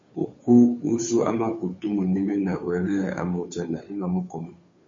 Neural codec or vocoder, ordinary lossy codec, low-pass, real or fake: codec, 16 kHz, 2 kbps, FunCodec, trained on Chinese and English, 25 frames a second; MP3, 32 kbps; 7.2 kHz; fake